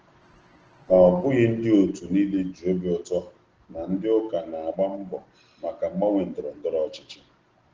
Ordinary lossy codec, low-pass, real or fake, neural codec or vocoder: Opus, 16 kbps; 7.2 kHz; real; none